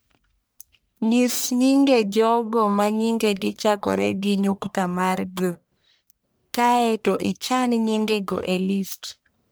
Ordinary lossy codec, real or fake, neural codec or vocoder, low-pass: none; fake; codec, 44.1 kHz, 1.7 kbps, Pupu-Codec; none